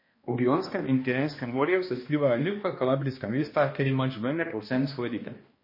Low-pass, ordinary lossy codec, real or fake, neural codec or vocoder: 5.4 kHz; MP3, 24 kbps; fake; codec, 16 kHz, 1 kbps, X-Codec, HuBERT features, trained on balanced general audio